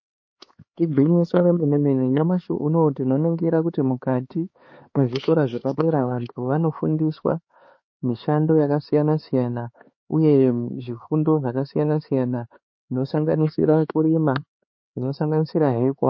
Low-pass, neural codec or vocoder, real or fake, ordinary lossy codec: 7.2 kHz; codec, 16 kHz, 4 kbps, X-Codec, HuBERT features, trained on LibriSpeech; fake; MP3, 32 kbps